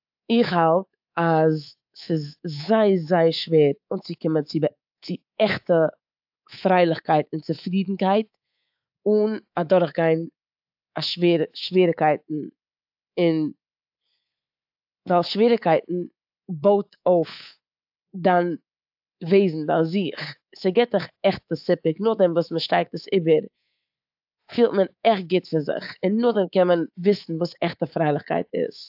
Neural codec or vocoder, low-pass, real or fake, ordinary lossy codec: codec, 24 kHz, 3.1 kbps, DualCodec; 5.4 kHz; fake; AAC, 48 kbps